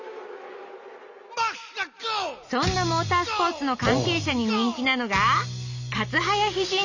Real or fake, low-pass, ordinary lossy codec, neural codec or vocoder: real; 7.2 kHz; none; none